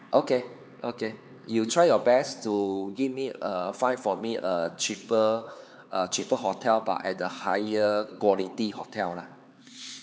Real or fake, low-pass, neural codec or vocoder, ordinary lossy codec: fake; none; codec, 16 kHz, 4 kbps, X-Codec, HuBERT features, trained on LibriSpeech; none